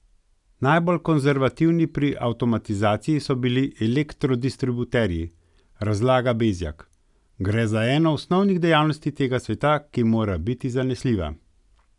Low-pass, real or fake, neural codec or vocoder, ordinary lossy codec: 10.8 kHz; real; none; none